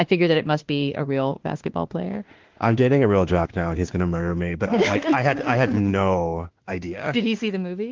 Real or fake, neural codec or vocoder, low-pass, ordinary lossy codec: fake; autoencoder, 48 kHz, 32 numbers a frame, DAC-VAE, trained on Japanese speech; 7.2 kHz; Opus, 16 kbps